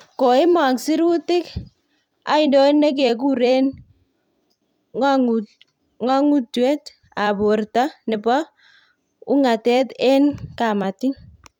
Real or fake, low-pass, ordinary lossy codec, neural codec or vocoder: fake; 19.8 kHz; none; vocoder, 44.1 kHz, 128 mel bands every 256 samples, BigVGAN v2